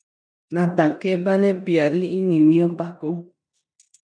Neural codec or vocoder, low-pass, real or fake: codec, 16 kHz in and 24 kHz out, 0.9 kbps, LongCat-Audio-Codec, four codebook decoder; 9.9 kHz; fake